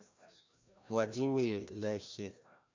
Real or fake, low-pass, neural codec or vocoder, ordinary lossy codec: fake; 7.2 kHz; codec, 16 kHz, 1 kbps, FreqCodec, larger model; MP3, 64 kbps